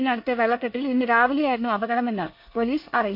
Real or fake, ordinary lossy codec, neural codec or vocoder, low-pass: fake; MP3, 32 kbps; codec, 24 kHz, 1 kbps, SNAC; 5.4 kHz